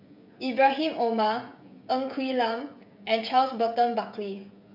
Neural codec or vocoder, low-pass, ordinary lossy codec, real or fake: codec, 16 kHz, 16 kbps, FreqCodec, smaller model; 5.4 kHz; none; fake